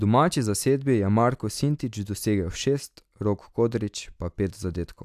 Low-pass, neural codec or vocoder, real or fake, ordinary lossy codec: 14.4 kHz; none; real; AAC, 96 kbps